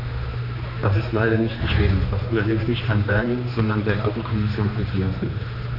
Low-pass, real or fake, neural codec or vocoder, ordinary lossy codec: 5.4 kHz; fake; codec, 16 kHz, 2 kbps, X-Codec, HuBERT features, trained on general audio; none